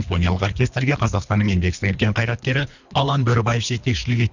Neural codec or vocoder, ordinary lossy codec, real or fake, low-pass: codec, 24 kHz, 3 kbps, HILCodec; none; fake; 7.2 kHz